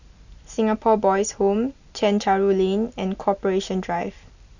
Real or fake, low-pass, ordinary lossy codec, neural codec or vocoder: real; 7.2 kHz; none; none